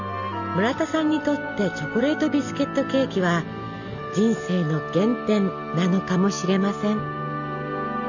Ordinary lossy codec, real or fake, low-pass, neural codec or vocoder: none; real; 7.2 kHz; none